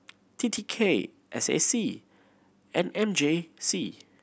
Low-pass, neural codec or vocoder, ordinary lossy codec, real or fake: none; none; none; real